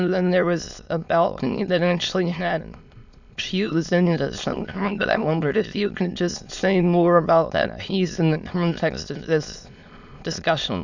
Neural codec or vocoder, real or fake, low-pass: autoencoder, 22.05 kHz, a latent of 192 numbers a frame, VITS, trained on many speakers; fake; 7.2 kHz